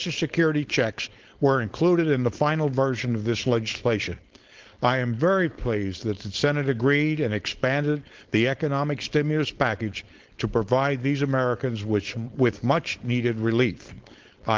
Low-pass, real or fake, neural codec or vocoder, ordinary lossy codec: 7.2 kHz; fake; codec, 16 kHz, 4.8 kbps, FACodec; Opus, 16 kbps